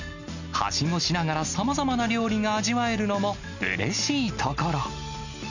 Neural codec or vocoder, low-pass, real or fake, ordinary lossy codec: none; 7.2 kHz; real; none